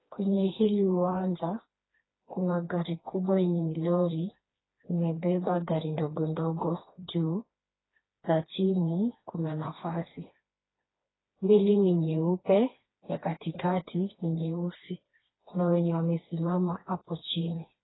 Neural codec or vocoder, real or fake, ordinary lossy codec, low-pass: codec, 16 kHz, 2 kbps, FreqCodec, smaller model; fake; AAC, 16 kbps; 7.2 kHz